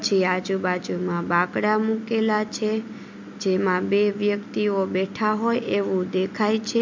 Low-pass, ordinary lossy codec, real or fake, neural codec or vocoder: 7.2 kHz; MP3, 64 kbps; real; none